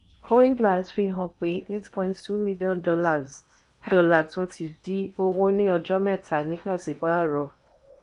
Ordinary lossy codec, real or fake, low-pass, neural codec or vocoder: none; fake; 10.8 kHz; codec, 16 kHz in and 24 kHz out, 0.8 kbps, FocalCodec, streaming, 65536 codes